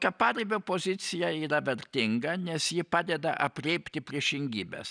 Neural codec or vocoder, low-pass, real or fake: none; 9.9 kHz; real